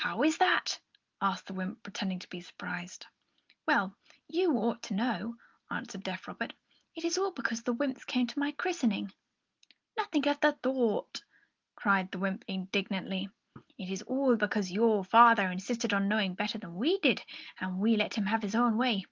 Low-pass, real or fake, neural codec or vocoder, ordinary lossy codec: 7.2 kHz; real; none; Opus, 32 kbps